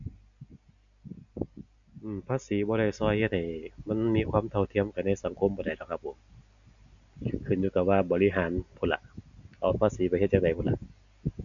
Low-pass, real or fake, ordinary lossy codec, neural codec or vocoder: 7.2 kHz; real; none; none